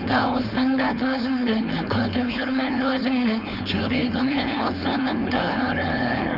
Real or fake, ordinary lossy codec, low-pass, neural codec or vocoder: fake; none; 5.4 kHz; codec, 16 kHz, 4.8 kbps, FACodec